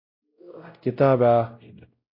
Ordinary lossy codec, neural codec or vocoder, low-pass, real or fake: MP3, 32 kbps; codec, 16 kHz, 0.5 kbps, X-Codec, WavLM features, trained on Multilingual LibriSpeech; 5.4 kHz; fake